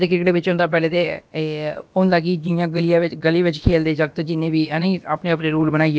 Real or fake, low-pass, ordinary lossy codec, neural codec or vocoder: fake; none; none; codec, 16 kHz, about 1 kbps, DyCAST, with the encoder's durations